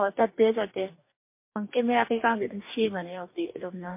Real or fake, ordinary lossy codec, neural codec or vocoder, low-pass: fake; MP3, 24 kbps; codec, 44.1 kHz, 2.6 kbps, DAC; 3.6 kHz